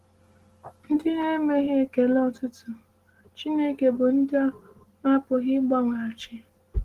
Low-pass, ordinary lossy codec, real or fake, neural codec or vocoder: 14.4 kHz; Opus, 16 kbps; real; none